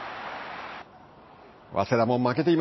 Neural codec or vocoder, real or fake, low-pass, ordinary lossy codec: none; real; 7.2 kHz; MP3, 24 kbps